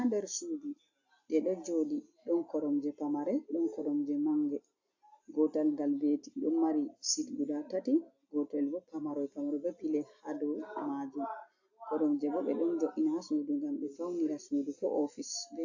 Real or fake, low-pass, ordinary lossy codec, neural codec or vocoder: real; 7.2 kHz; AAC, 48 kbps; none